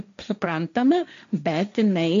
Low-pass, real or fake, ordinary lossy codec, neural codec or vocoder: 7.2 kHz; fake; MP3, 64 kbps; codec, 16 kHz, 1.1 kbps, Voila-Tokenizer